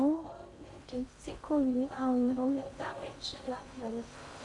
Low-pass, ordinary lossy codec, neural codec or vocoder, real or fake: 10.8 kHz; MP3, 64 kbps; codec, 16 kHz in and 24 kHz out, 0.6 kbps, FocalCodec, streaming, 4096 codes; fake